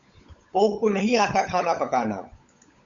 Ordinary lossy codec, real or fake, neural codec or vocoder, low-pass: Opus, 64 kbps; fake; codec, 16 kHz, 16 kbps, FunCodec, trained on LibriTTS, 50 frames a second; 7.2 kHz